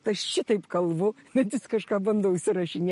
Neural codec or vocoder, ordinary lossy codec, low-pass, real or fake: none; MP3, 48 kbps; 14.4 kHz; real